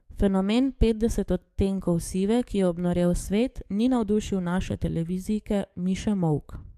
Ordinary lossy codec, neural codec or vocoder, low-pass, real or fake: AAC, 96 kbps; codec, 44.1 kHz, 7.8 kbps, DAC; 14.4 kHz; fake